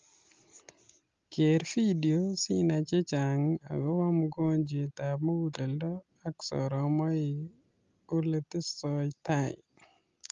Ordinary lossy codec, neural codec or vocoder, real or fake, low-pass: Opus, 32 kbps; none; real; 7.2 kHz